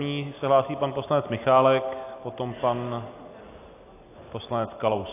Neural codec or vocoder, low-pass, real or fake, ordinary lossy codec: none; 3.6 kHz; real; AAC, 24 kbps